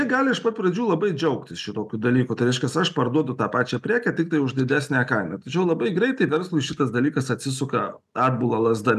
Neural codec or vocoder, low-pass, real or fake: none; 14.4 kHz; real